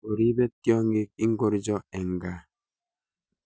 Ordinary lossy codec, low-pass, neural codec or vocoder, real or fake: none; none; none; real